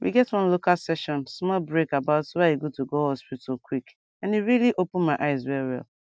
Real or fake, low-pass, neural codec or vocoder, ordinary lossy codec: real; none; none; none